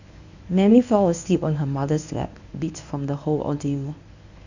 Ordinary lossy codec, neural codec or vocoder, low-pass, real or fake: none; codec, 24 kHz, 0.9 kbps, WavTokenizer, medium speech release version 1; 7.2 kHz; fake